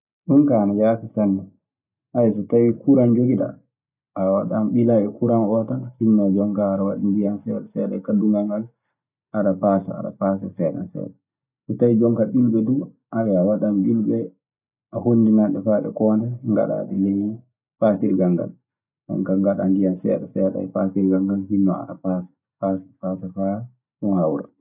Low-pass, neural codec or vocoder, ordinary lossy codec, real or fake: 3.6 kHz; none; none; real